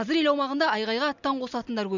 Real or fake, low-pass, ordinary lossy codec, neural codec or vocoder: real; 7.2 kHz; none; none